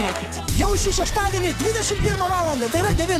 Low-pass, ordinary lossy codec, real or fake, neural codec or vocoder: 14.4 kHz; MP3, 96 kbps; fake; codec, 44.1 kHz, 2.6 kbps, SNAC